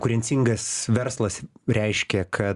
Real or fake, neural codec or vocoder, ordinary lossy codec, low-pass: real; none; Opus, 64 kbps; 10.8 kHz